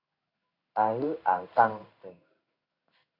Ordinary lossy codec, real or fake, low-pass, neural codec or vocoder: Opus, 64 kbps; fake; 5.4 kHz; codec, 16 kHz in and 24 kHz out, 1 kbps, XY-Tokenizer